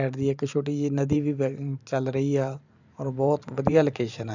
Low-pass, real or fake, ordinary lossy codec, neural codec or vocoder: 7.2 kHz; fake; none; vocoder, 44.1 kHz, 128 mel bands every 512 samples, BigVGAN v2